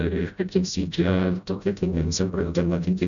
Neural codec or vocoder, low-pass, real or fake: codec, 16 kHz, 0.5 kbps, FreqCodec, smaller model; 7.2 kHz; fake